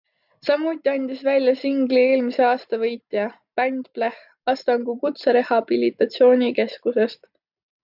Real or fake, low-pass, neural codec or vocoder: real; 5.4 kHz; none